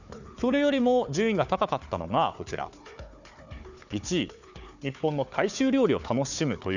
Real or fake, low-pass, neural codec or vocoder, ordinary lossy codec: fake; 7.2 kHz; codec, 16 kHz, 4 kbps, FunCodec, trained on Chinese and English, 50 frames a second; none